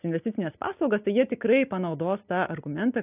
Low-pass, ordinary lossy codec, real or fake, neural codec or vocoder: 3.6 kHz; AAC, 32 kbps; real; none